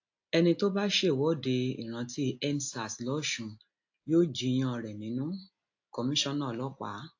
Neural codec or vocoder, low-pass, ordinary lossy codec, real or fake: none; 7.2 kHz; AAC, 48 kbps; real